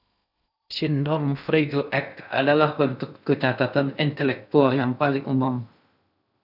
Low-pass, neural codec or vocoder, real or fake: 5.4 kHz; codec, 16 kHz in and 24 kHz out, 0.6 kbps, FocalCodec, streaming, 2048 codes; fake